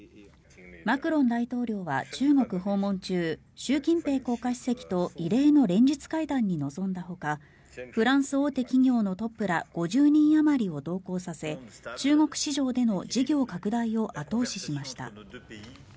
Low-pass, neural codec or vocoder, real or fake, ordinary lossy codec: none; none; real; none